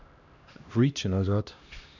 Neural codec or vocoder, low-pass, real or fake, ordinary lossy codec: codec, 16 kHz, 1 kbps, X-Codec, HuBERT features, trained on LibriSpeech; 7.2 kHz; fake; none